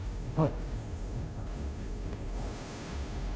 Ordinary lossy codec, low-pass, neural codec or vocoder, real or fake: none; none; codec, 16 kHz, 0.5 kbps, FunCodec, trained on Chinese and English, 25 frames a second; fake